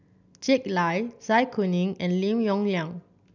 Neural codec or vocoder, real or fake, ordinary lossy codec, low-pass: none; real; none; 7.2 kHz